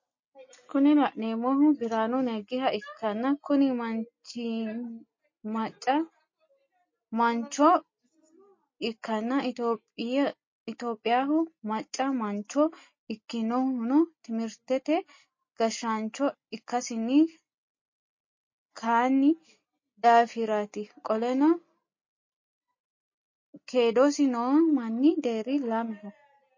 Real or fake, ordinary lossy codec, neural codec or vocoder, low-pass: real; MP3, 32 kbps; none; 7.2 kHz